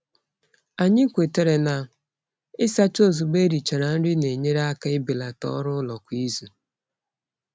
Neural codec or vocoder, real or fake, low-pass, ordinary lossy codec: none; real; none; none